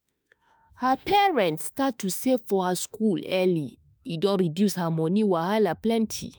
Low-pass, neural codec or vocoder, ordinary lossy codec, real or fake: none; autoencoder, 48 kHz, 32 numbers a frame, DAC-VAE, trained on Japanese speech; none; fake